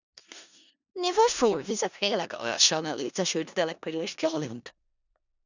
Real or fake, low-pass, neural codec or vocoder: fake; 7.2 kHz; codec, 16 kHz in and 24 kHz out, 0.4 kbps, LongCat-Audio-Codec, four codebook decoder